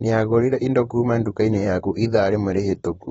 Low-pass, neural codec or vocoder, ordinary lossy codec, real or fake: 19.8 kHz; vocoder, 44.1 kHz, 128 mel bands, Pupu-Vocoder; AAC, 24 kbps; fake